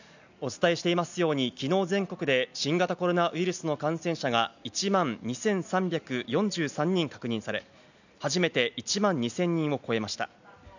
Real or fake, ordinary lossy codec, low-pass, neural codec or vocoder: real; none; 7.2 kHz; none